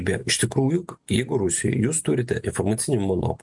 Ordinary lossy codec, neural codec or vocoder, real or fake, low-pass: MP3, 64 kbps; none; real; 10.8 kHz